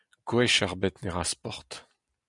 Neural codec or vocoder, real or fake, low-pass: none; real; 10.8 kHz